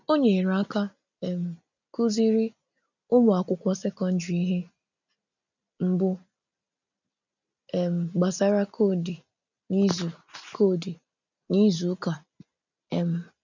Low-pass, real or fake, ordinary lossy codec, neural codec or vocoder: 7.2 kHz; real; none; none